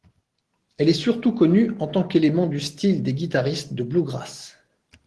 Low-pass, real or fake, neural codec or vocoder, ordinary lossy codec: 10.8 kHz; real; none; Opus, 16 kbps